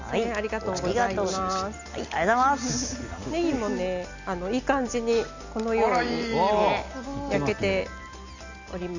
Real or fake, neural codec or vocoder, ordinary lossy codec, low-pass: real; none; Opus, 64 kbps; 7.2 kHz